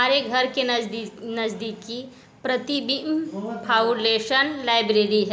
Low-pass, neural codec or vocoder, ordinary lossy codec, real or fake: none; none; none; real